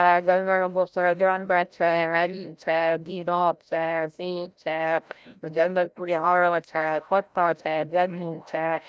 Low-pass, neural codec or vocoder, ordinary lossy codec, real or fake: none; codec, 16 kHz, 0.5 kbps, FreqCodec, larger model; none; fake